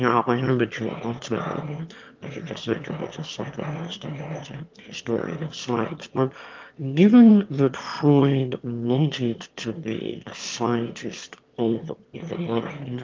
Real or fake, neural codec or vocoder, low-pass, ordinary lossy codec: fake; autoencoder, 22.05 kHz, a latent of 192 numbers a frame, VITS, trained on one speaker; 7.2 kHz; Opus, 24 kbps